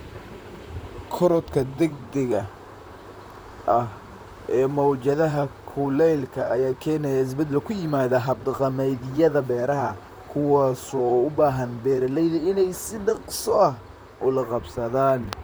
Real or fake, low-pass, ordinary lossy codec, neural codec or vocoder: fake; none; none; vocoder, 44.1 kHz, 128 mel bands, Pupu-Vocoder